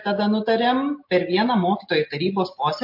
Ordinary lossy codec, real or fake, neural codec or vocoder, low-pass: MP3, 32 kbps; real; none; 5.4 kHz